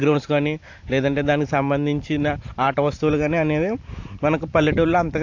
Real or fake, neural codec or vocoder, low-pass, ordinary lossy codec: fake; vocoder, 44.1 kHz, 128 mel bands every 512 samples, BigVGAN v2; 7.2 kHz; AAC, 48 kbps